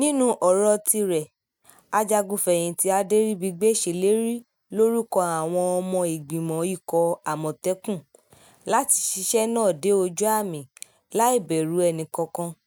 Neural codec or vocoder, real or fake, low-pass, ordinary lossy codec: none; real; none; none